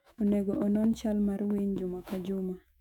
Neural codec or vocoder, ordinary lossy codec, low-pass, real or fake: autoencoder, 48 kHz, 128 numbers a frame, DAC-VAE, trained on Japanese speech; none; 19.8 kHz; fake